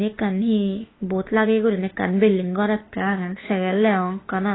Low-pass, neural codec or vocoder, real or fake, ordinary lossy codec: 7.2 kHz; autoencoder, 48 kHz, 32 numbers a frame, DAC-VAE, trained on Japanese speech; fake; AAC, 16 kbps